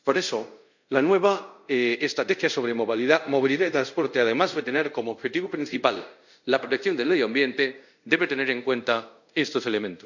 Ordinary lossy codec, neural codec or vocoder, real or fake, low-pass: none; codec, 24 kHz, 0.5 kbps, DualCodec; fake; 7.2 kHz